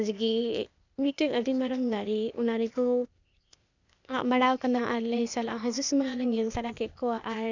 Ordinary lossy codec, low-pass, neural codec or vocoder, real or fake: none; 7.2 kHz; codec, 16 kHz, 0.8 kbps, ZipCodec; fake